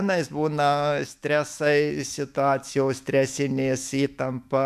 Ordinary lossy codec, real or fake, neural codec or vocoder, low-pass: MP3, 96 kbps; fake; autoencoder, 48 kHz, 128 numbers a frame, DAC-VAE, trained on Japanese speech; 14.4 kHz